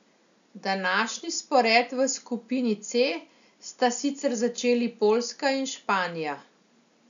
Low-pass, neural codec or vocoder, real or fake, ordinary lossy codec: 7.2 kHz; none; real; none